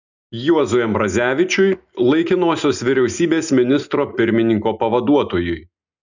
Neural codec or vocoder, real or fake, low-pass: none; real; 7.2 kHz